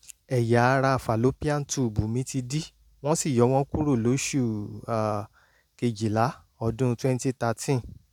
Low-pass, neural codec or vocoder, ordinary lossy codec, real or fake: 19.8 kHz; none; none; real